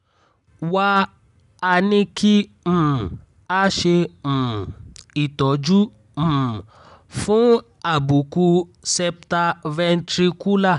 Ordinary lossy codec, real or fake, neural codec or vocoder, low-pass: none; real; none; 10.8 kHz